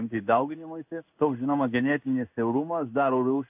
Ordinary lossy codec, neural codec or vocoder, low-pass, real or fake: AAC, 32 kbps; codec, 16 kHz in and 24 kHz out, 1 kbps, XY-Tokenizer; 3.6 kHz; fake